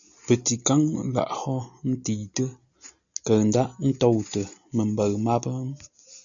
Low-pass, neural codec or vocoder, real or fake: 7.2 kHz; none; real